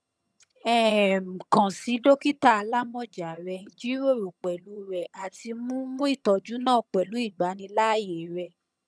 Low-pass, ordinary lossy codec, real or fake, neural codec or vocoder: none; none; fake; vocoder, 22.05 kHz, 80 mel bands, HiFi-GAN